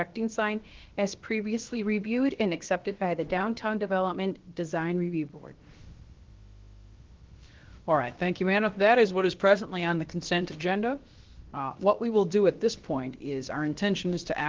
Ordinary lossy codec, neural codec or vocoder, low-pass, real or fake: Opus, 16 kbps; codec, 16 kHz, about 1 kbps, DyCAST, with the encoder's durations; 7.2 kHz; fake